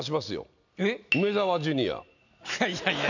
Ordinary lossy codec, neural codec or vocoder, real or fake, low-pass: none; none; real; 7.2 kHz